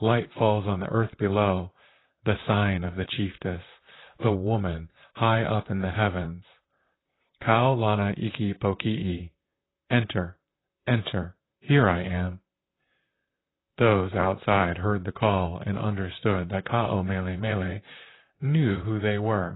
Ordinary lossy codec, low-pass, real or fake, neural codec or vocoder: AAC, 16 kbps; 7.2 kHz; fake; vocoder, 44.1 kHz, 128 mel bands, Pupu-Vocoder